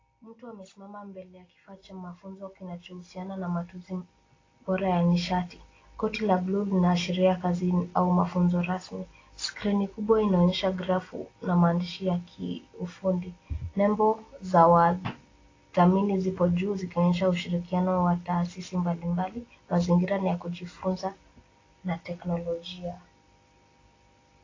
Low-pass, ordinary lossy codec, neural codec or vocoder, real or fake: 7.2 kHz; AAC, 32 kbps; none; real